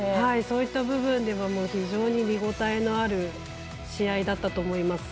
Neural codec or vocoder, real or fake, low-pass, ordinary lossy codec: none; real; none; none